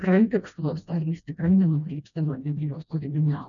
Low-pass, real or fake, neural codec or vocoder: 7.2 kHz; fake; codec, 16 kHz, 1 kbps, FreqCodec, smaller model